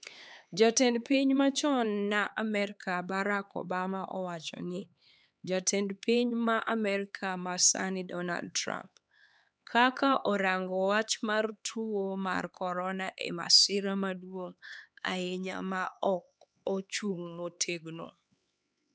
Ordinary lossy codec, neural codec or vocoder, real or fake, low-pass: none; codec, 16 kHz, 4 kbps, X-Codec, HuBERT features, trained on LibriSpeech; fake; none